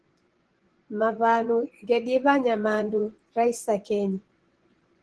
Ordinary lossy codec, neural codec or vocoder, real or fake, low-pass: Opus, 16 kbps; vocoder, 24 kHz, 100 mel bands, Vocos; fake; 10.8 kHz